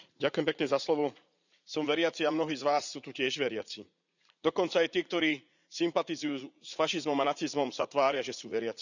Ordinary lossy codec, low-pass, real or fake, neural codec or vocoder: none; 7.2 kHz; fake; vocoder, 44.1 kHz, 80 mel bands, Vocos